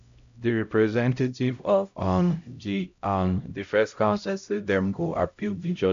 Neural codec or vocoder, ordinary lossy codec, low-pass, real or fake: codec, 16 kHz, 0.5 kbps, X-Codec, HuBERT features, trained on LibriSpeech; AAC, 64 kbps; 7.2 kHz; fake